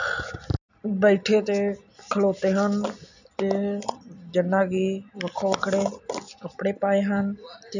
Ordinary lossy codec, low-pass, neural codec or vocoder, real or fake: none; 7.2 kHz; none; real